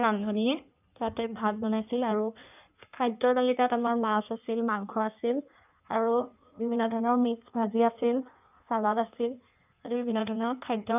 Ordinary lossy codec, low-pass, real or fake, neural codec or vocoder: none; 3.6 kHz; fake; codec, 16 kHz in and 24 kHz out, 1.1 kbps, FireRedTTS-2 codec